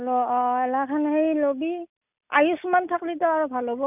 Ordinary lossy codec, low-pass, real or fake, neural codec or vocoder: none; 3.6 kHz; real; none